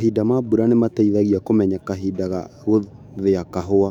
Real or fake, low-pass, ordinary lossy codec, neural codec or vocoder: fake; 19.8 kHz; none; autoencoder, 48 kHz, 128 numbers a frame, DAC-VAE, trained on Japanese speech